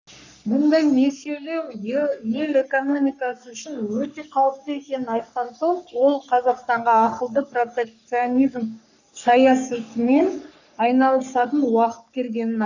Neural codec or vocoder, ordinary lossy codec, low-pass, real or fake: codec, 44.1 kHz, 3.4 kbps, Pupu-Codec; none; 7.2 kHz; fake